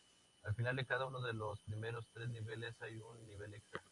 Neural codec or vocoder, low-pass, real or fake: vocoder, 24 kHz, 100 mel bands, Vocos; 10.8 kHz; fake